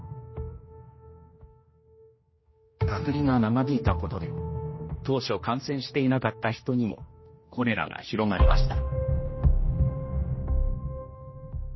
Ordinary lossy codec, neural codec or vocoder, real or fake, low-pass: MP3, 24 kbps; codec, 16 kHz, 1 kbps, X-Codec, HuBERT features, trained on general audio; fake; 7.2 kHz